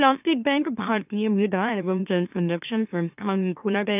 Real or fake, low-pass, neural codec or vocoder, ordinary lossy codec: fake; 3.6 kHz; autoencoder, 44.1 kHz, a latent of 192 numbers a frame, MeloTTS; none